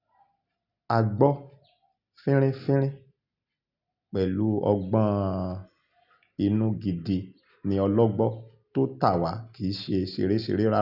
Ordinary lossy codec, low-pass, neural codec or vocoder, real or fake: none; 5.4 kHz; none; real